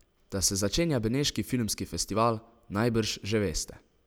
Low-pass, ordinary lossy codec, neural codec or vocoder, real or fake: none; none; none; real